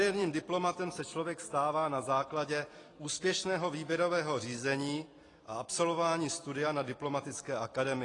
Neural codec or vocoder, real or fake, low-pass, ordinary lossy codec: vocoder, 44.1 kHz, 128 mel bands every 256 samples, BigVGAN v2; fake; 10.8 kHz; AAC, 32 kbps